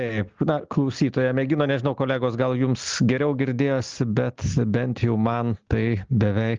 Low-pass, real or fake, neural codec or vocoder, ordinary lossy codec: 7.2 kHz; real; none; Opus, 24 kbps